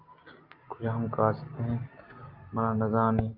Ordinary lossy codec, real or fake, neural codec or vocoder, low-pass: Opus, 24 kbps; real; none; 5.4 kHz